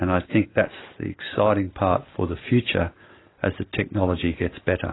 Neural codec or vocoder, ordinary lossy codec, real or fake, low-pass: none; AAC, 16 kbps; real; 7.2 kHz